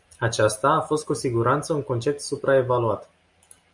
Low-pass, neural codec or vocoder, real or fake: 10.8 kHz; none; real